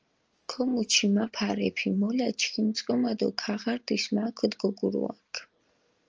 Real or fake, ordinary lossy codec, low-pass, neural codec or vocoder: real; Opus, 24 kbps; 7.2 kHz; none